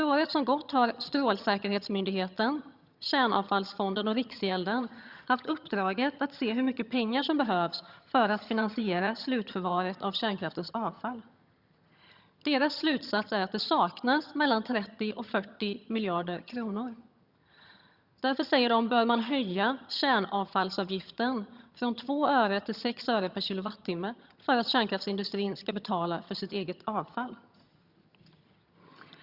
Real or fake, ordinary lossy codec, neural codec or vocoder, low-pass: fake; Opus, 64 kbps; vocoder, 22.05 kHz, 80 mel bands, HiFi-GAN; 5.4 kHz